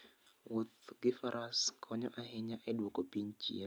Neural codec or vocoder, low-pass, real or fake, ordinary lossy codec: none; none; real; none